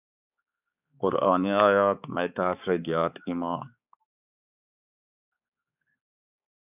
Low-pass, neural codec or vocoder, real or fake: 3.6 kHz; codec, 16 kHz, 4 kbps, X-Codec, HuBERT features, trained on balanced general audio; fake